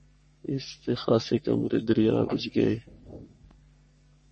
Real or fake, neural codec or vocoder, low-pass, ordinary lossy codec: fake; codec, 44.1 kHz, 3.4 kbps, Pupu-Codec; 10.8 kHz; MP3, 32 kbps